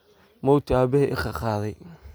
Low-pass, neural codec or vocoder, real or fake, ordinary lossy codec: none; none; real; none